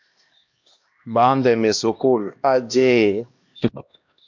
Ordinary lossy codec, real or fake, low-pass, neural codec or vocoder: MP3, 64 kbps; fake; 7.2 kHz; codec, 16 kHz, 1 kbps, X-Codec, HuBERT features, trained on LibriSpeech